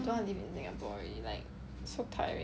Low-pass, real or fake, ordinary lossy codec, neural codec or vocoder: none; real; none; none